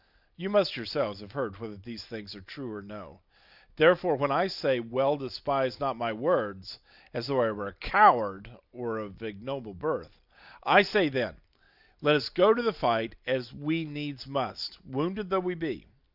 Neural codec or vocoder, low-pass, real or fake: none; 5.4 kHz; real